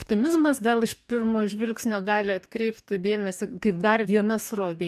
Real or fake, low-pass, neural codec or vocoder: fake; 14.4 kHz; codec, 44.1 kHz, 2.6 kbps, DAC